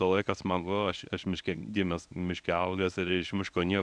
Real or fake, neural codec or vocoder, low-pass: fake; codec, 24 kHz, 0.9 kbps, WavTokenizer, medium speech release version 1; 9.9 kHz